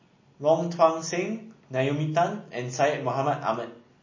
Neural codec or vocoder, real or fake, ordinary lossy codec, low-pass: none; real; MP3, 32 kbps; 7.2 kHz